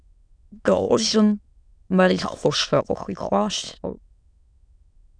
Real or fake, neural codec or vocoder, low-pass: fake; autoencoder, 22.05 kHz, a latent of 192 numbers a frame, VITS, trained on many speakers; 9.9 kHz